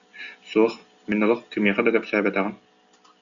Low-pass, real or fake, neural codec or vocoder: 7.2 kHz; real; none